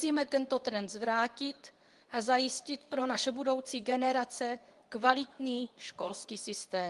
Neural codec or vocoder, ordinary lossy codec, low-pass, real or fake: codec, 24 kHz, 0.9 kbps, WavTokenizer, medium speech release version 2; Opus, 24 kbps; 10.8 kHz; fake